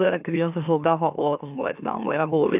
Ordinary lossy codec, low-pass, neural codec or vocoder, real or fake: none; 3.6 kHz; autoencoder, 44.1 kHz, a latent of 192 numbers a frame, MeloTTS; fake